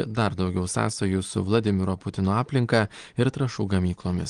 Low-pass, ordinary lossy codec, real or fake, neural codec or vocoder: 9.9 kHz; Opus, 24 kbps; real; none